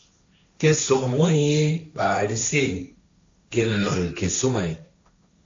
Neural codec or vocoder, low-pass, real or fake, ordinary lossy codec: codec, 16 kHz, 1.1 kbps, Voila-Tokenizer; 7.2 kHz; fake; AAC, 48 kbps